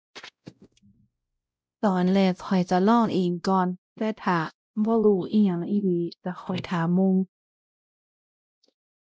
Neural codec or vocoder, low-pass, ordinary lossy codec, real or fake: codec, 16 kHz, 0.5 kbps, X-Codec, WavLM features, trained on Multilingual LibriSpeech; none; none; fake